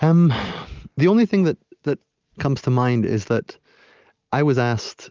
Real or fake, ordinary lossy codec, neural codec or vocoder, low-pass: real; Opus, 24 kbps; none; 7.2 kHz